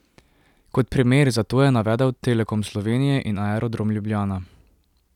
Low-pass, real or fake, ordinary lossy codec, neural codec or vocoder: 19.8 kHz; real; none; none